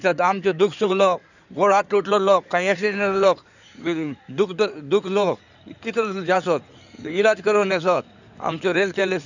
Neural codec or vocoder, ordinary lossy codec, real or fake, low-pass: codec, 16 kHz in and 24 kHz out, 2.2 kbps, FireRedTTS-2 codec; none; fake; 7.2 kHz